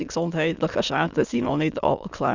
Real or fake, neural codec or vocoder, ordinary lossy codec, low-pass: fake; autoencoder, 22.05 kHz, a latent of 192 numbers a frame, VITS, trained on many speakers; Opus, 64 kbps; 7.2 kHz